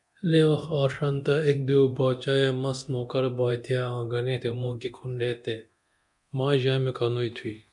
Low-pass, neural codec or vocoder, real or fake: 10.8 kHz; codec, 24 kHz, 0.9 kbps, DualCodec; fake